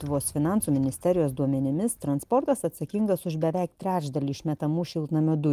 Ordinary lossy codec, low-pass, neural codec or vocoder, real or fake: Opus, 32 kbps; 14.4 kHz; none; real